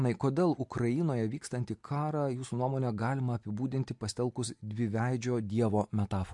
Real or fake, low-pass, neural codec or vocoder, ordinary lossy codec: real; 10.8 kHz; none; MP3, 64 kbps